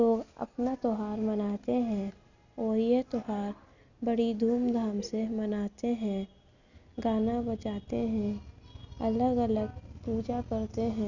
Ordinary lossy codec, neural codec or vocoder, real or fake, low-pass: none; none; real; 7.2 kHz